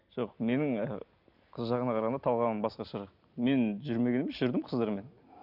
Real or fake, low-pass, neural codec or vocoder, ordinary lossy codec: real; 5.4 kHz; none; none